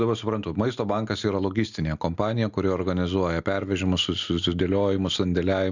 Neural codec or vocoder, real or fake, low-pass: none; real; 7.2 kHz